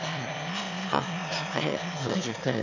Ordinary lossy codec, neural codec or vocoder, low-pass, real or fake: none; autoencoder, 22.05 kHz, a latent of 192 numbers a frame, VITS, trained on one speaker; 7.2 kHz; fake